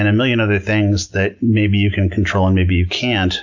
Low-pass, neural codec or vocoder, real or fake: 7.2 kHz; none; real